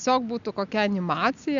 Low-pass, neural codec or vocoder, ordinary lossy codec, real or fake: 7.2 kHz; none; AAC, 64 kbps; real